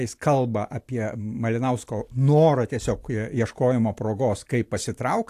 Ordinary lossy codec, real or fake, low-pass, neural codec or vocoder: AAC, 64 kbps; real; 14.4 kHz; none